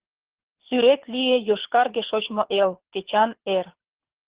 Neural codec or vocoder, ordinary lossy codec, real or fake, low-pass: codec, 24 kHz, 6 kbps, HILCodec; Opus, 16 kbps; fake; 3.6 kHz